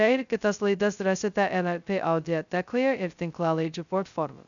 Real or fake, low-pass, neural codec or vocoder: fake; 7.2 kHz; codec, 16 kHz, 0.2 kbps, FocalCodec